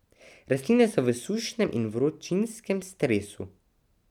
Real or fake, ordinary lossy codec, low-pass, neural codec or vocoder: real; none; 19.8 kHz; none